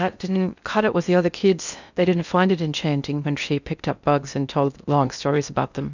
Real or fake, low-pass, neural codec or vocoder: fake; 7.2 kHz; codec, 16 kHz in and 24 kHz out, 0.6 kbps, FocalCodec, streaming, 2048 codes